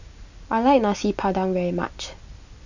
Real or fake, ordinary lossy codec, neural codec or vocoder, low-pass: real; none; none; 7.2 kHz